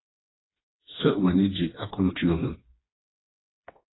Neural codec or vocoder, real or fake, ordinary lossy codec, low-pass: codec, 16 kHz, 2 kbps, FreqCodec, smaller model; fake; AAC, 16 kbps; 7.2 kHz